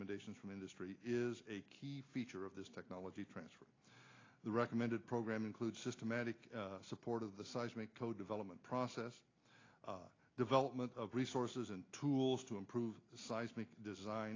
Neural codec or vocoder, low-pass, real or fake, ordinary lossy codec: none; 7.2 kHz; real; AAC, 32 kbps